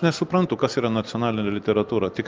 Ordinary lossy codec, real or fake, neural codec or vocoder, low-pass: Opus, 24 kbps; real; none; 7.2 kHz